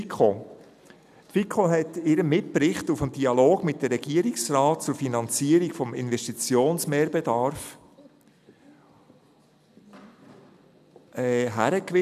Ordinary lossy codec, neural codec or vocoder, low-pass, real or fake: none; none; 14.4 kHz; real